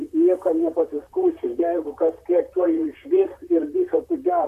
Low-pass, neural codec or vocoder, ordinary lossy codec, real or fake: 14.4 kHz; vocoder, 44.1 kHz, 128 mel bands, Pupu-Vocoder; AAC, 96 kbps; fake